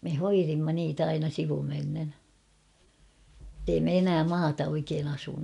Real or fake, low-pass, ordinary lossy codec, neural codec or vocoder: real; 10.8 kHz; none; none